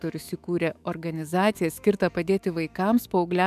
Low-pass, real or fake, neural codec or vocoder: 14.4 kHz; fake; autoencoder, 48 kHz, 128 numbers a frame, DAC-VAE, trained on Japanese speech